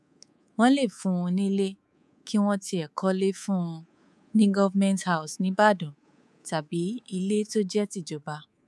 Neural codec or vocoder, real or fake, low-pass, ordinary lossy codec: codec, 24 kHz, 3.1 kbps, DualCodec; fake; none; none